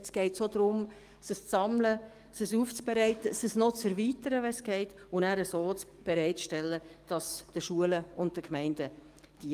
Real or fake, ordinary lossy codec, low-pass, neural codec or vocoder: fake; Opus, 32 kbps; 14.4 kHz; codec, 44.1 kHz, 7.8 kbps, DAC